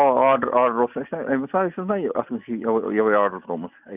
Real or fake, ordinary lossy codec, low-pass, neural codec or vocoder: real; none; 3.6 kHz; none